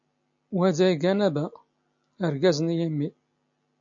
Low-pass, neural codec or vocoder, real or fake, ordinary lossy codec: 7.2 kHz; none; real; AAC, 64 kbps